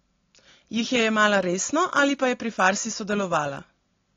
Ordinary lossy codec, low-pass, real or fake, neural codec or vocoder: AAC, 32 kbps; 7.2 kHz; real; none